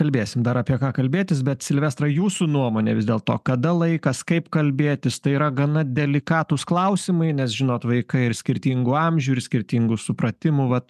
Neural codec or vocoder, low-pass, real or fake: none; 14.4 kHz; real